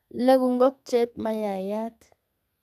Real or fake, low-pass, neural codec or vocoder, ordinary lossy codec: fake; 14.4 kHz; codec, 32 kHz, 1.9 kbps, SNAC; none